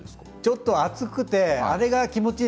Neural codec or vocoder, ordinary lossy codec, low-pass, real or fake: none; none; none; real